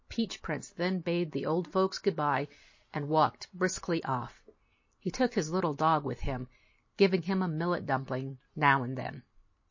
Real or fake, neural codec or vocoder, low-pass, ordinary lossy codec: real; none; 7.2 kHz; MP3, 32 kbps